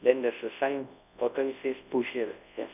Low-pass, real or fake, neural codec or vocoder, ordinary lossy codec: 3.6 kHz; fake; codec, 24 kHz, 0.9 kbps, WavTokenizer, large speech release; none